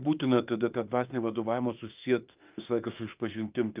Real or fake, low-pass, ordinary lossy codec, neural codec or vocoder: fake; 3.6 kHz; Opus, 24 kbps; autoencoder, 48 kHz, 32 numbers a frame, DAC-VAE, trained on Japanese speech